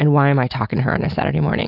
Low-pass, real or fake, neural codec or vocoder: 5.4 kHz; real; none